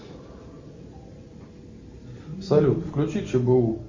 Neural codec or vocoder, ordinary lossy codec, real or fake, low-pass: none; MP3, 32 kbps; real; 7.2 kHz